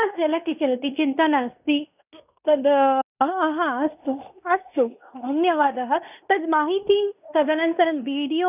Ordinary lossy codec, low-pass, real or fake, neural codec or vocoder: none; 3.6 kHz; fake; codec, 16 kHz in and 24 kHz out, 0.9 kbps, LongCat-Audio-Codec, fine tuned four codebook decoder